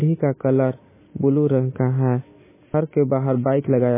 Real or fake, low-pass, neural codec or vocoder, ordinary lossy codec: real; 3.6 kHz; none; MP3, 16 kbps